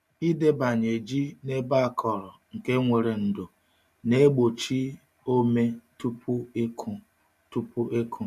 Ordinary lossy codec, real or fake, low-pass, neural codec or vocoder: none; real; 14.4 kHz; none